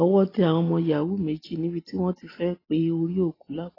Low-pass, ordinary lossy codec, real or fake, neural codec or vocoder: 5.4 kHz; AAC, 24 kbps; real; none